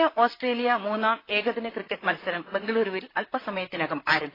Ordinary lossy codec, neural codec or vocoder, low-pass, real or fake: AAC, 24 kbps; vocoder, 44.1 kHz, 128 mel bands, Pupu-Vocoder; 5.4 kHz; fake